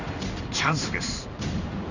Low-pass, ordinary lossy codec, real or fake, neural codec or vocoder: 7.2 kHz; none; fake; codec, 16 kHz in and 24 kHz out, 1 kbps, XY-Tokenizer